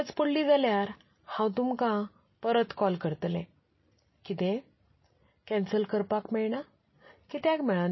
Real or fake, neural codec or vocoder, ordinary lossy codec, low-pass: real; none; MP3, 24 kbps; 7.2 kHz